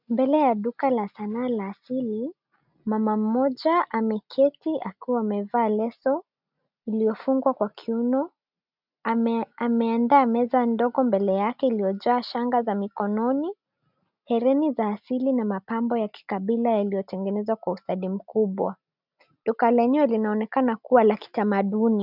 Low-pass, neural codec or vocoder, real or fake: 5.4 kHz; none; real